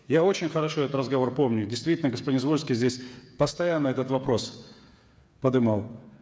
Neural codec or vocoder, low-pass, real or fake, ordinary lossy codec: codec, 16 kHz, 8 kbps, FreqCodec, smaller model; none; fake; none